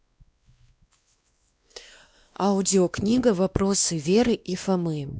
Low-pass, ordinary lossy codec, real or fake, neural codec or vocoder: none; none; fake; codec, 16 kHz, 2 kbps, X-Codec, WavLM features, trained on Multilingual LibriSpeech